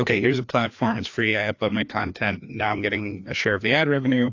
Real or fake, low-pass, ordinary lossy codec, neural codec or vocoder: fake; 7.2 kHz; AAC, 48 kbps; codec, 16 kHz, 2 kbps, FreqCodec, larger model